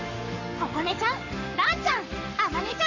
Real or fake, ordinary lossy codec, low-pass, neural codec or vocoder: fake; none; 7.2 kHz; codec, 44.1 kHz, 7.8 kbps, Pupu-Codec